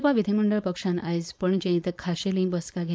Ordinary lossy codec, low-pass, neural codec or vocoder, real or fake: none; none; codec, 16 kHz, 4.8 kbps, FACodec; fake